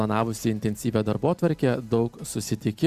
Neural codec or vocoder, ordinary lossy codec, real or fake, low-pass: none; Opus, 64 kbps; real; 14.4 kHz